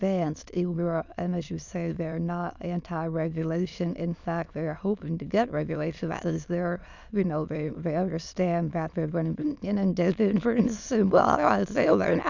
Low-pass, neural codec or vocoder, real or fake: 7.2 kHz; autoencoder, 22.05 kHz, a latent of 192 numbers a frame, VITS, trained on many speakers; fake